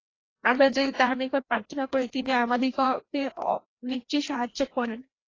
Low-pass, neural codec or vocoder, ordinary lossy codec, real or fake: 7.2 kHz; codec, 16 kHz, 1 kbps, FreqCodec, larger model; AAC, 32 kbps; fake